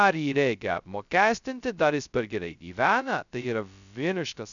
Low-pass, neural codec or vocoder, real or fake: 7.2 kHz; codec, 16 kHz, 0.2 kbps, FocalCodec; fake